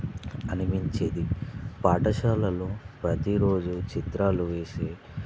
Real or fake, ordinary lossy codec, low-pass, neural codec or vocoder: real; none; none; none